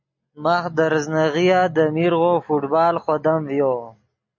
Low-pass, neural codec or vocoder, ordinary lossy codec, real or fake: 7.2 kHz; none; MP3, 48 kbps; real